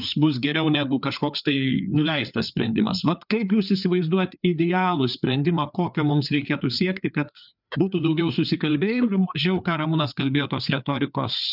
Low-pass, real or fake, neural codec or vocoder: 5.4 kHz; fake; codec, 16 kHz, 4 kbps, FreqCodec, larger model